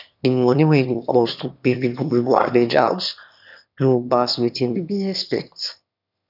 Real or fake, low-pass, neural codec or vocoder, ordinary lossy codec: fake; 5.4 kHz; autoencoder, 22.05 kHz, a latent of 192 numbers a frame, VITS, trained on one speaker; none